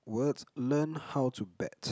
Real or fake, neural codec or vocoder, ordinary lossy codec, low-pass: real; none; none; none